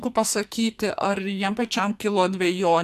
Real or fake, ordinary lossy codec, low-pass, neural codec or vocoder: fake; AAC, 96 kbps; 14.4 kHz; codec, 44.1 kHz, 2.6 kbps, SNAC